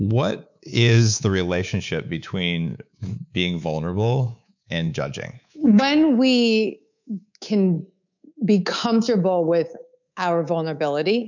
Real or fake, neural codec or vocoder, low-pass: fake; codec, 24 kHz, 3.1 kbps, DualCodec; 7.2 kHz